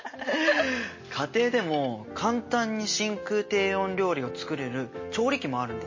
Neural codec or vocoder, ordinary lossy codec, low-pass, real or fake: none; MP3, 32 kbps; 7.2 kHz; real